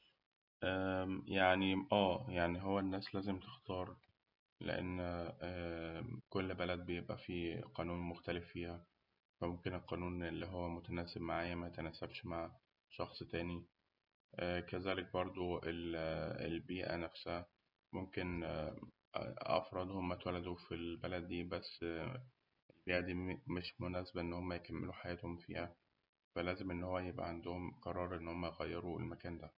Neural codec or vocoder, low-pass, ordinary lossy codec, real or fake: none; 5.4 kHz; none; real